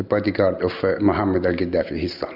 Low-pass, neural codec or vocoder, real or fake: 5.4 kHz; none; real